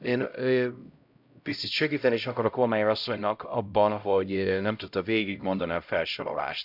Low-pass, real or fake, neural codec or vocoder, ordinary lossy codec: 5.4 kHz; fake; codec, 16 kHz, 0.5 kbps, X-Codec, HuBERT features, trained on LibriSpeech; none